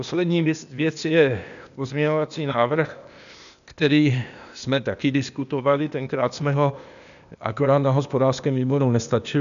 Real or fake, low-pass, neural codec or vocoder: fake; 7.2 kHz; codec, 16 kHz, 0.8 kbps, ZipCodec